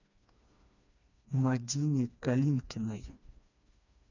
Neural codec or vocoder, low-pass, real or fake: codec, 16 kHz, 2 kbps, FreqCodec, smaller model; 7.2 kHz; fake